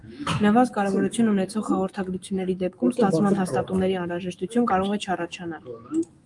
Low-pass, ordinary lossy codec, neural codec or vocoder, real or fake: 10.8 kHz; Opus, 32 kbps; autoencoder, 48 kHz, 128 numbers a frame, DAC-VAE, trained on Japanese speech; fake